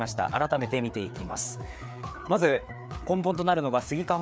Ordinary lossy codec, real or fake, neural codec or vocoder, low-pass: none; fake; codec, 16 kHz, 4 kbps, FreqCodec, larger model; none